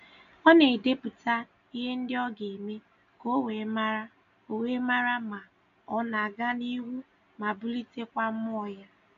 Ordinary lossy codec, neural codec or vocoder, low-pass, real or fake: none; none; 7.2 kHz; real